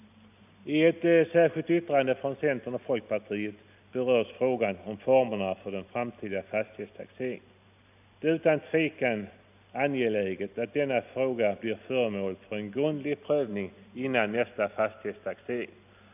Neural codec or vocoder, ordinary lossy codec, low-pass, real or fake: none; none; 3.6 kHz; real